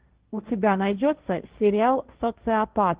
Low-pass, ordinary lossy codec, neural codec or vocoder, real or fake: 3.6 kHz; Opus, 16 kbps; codec, 16 kHz, 1.1 kbps, Voila-Tokenizer; fake